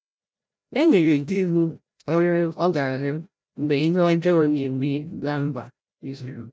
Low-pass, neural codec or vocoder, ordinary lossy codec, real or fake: none; codec, 16 kHz, 0.5 kbps, FreqCodec, larger model; none; fake